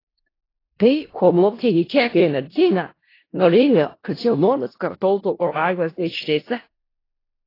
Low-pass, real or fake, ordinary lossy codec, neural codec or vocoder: 5.4 kHz; fake; AAC, 24 kbps; codec, 16 kHz in and 24 kHz out, 0.4 kbps, LongCat-Audio-Codec, four codebook decoder